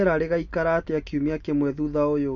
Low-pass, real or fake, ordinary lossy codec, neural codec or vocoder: 7.2 kHz; real; none; none